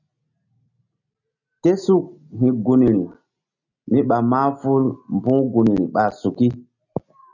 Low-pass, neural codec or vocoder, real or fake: 7.2 kHz; none; real